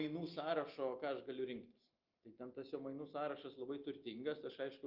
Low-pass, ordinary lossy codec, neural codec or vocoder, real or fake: 5.4 kHz; Opus, 32 kbps; none; real